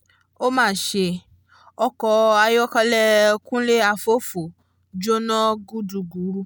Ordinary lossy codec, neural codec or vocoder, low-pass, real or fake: none; none; none; real